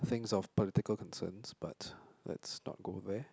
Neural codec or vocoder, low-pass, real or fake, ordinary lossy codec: none; none; real; none